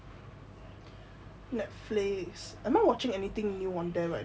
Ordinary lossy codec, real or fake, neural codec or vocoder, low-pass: none; real; none; none